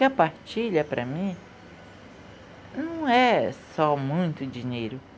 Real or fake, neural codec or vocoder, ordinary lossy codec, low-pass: real; none; none; none